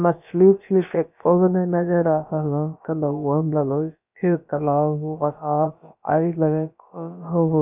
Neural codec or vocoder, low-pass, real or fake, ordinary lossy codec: codec, 16 kHz, about 1 kbps, DyCAST, with the encoder's durations; 3.6 kHz; fake; none